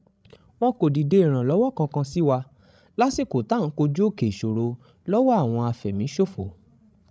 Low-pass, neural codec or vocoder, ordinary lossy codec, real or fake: none; codec, 16 kHz, 16 kbps, FreqCodec, larger model; none; fake